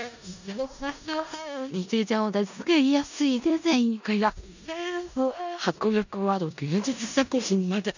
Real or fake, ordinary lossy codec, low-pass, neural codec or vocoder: fake; none; 7.2 kHz; codec, 16 kHz in and 24 kHz out, 0.4 kbps, LongCat-Audio-Codec, four codebook decoder